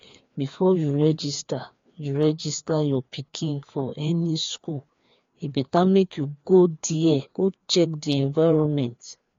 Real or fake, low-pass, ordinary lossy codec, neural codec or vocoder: fake; 7.2 kHz; AAC, 48 kbps; codec, 16 kHz, 2 kbps, FreqCodec, larger model